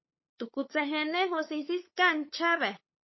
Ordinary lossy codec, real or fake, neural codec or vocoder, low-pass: MP3, 24 kbps; fake; codec, 16 kHz, 8 kbps, FunCodec, trained on LibriTTS, 25 frames a second; 7.2 kHz